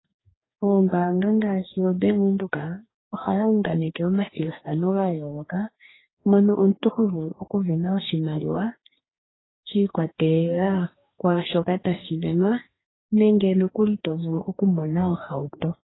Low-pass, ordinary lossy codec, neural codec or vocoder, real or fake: 7.2 kHz; AAC, 16 kbps; codec, 44.1 kHz, 2.6 kbps, DAC; fake